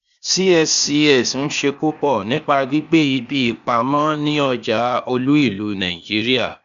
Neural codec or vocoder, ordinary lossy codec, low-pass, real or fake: codec, 16 kHz, 0.8 kbps, ZipCodec; none; 7.2 kHz; fake